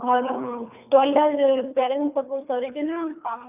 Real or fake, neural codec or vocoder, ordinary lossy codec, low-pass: fake; codec, 24 kHz, 3 kbps, HILCodec; none; 3.6 kHz